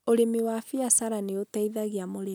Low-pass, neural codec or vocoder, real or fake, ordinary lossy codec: none; none; real; none